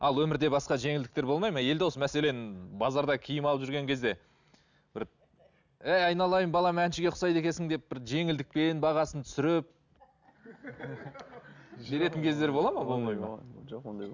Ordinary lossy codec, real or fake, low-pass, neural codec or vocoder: none; real; 7.2 kHz; none